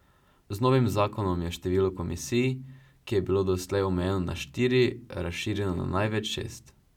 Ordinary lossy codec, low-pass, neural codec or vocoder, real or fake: none; 19.8 kHz; none; real